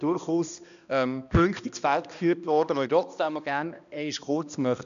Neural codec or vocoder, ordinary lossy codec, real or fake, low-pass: codec, 16 kHz, 1 kbps, X-Codec, HuBERT features, trained on balanced general audio; MP3, 96 kbps; fake; 7.2 kHz